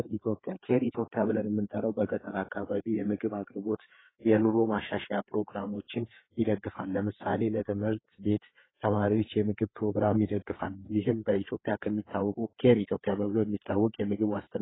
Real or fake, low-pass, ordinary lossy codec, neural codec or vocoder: fake; 7.2 kHz; AAC, 16 kbps; codec, 16 kHz, 4 kbps, FreqCodec, larger model